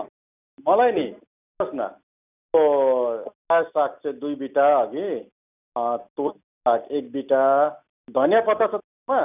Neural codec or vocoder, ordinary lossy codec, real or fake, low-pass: none; none; real; 3.6 kHz